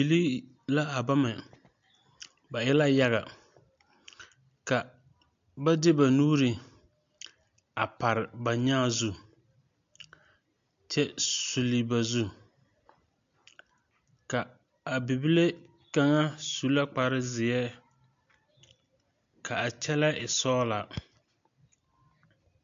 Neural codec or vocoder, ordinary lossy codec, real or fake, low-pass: none; AAC, 64 kbps; real; 7.2 kHz